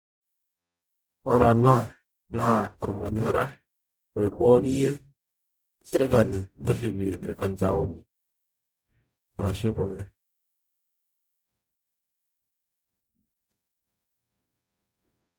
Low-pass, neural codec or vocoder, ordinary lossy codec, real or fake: none; codec, 44.1 kHz, 0.9 kbps, DAC; none; fake